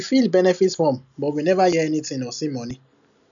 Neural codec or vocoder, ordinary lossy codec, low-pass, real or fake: none; none; 7.2 kHz; real